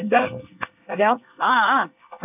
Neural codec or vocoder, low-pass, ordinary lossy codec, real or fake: codec, 24 kHz, 1 kbps, SNAC; 3.6 kHz; none; fake